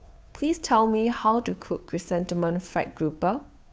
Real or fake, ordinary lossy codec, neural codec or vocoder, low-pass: fake; none; codec, 16 kHz, 2 kbps, FunCodec, trained on Chinese and English, 25 frames a second; none